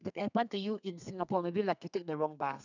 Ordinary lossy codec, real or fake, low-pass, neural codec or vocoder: none; fake; 7.2 kHz; codec, 32 kHz, 1.9 kbps, SNAC